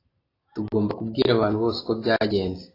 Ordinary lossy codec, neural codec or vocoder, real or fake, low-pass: AAC, 24 kbps; none; real; 5.4 kHz